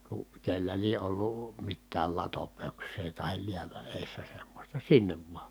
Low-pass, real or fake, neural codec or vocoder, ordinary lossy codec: none; fake; codec, 44.1 kHz, 7.8 kbps, DAC; none